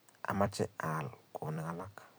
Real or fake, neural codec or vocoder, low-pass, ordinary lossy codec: fake; vocoder, 44.1 kHz, 128 mel bands every 256 samples, BigVGAN v2; none; none